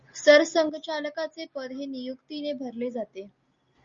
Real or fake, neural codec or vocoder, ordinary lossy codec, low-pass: real; none; Opus, 64 kbps; 7.2 kHz